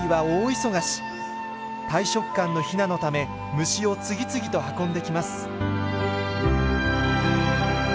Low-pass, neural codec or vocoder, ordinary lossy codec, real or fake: none; none; none; real